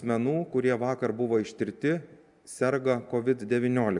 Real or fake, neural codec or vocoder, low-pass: real; none; 10.8 kHz